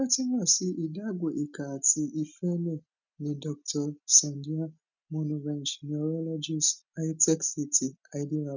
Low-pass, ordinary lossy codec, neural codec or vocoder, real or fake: 7.2 kHz; none; none; real